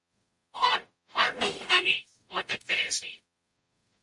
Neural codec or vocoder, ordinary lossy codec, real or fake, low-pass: codec, 44.1 kHz, 0.9 kbps, DAC; MP3, 48 kbps; fake; 10.8 kHz